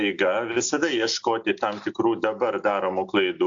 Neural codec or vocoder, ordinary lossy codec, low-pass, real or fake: none; AAC, 64 kbps; 7.2 kHz; real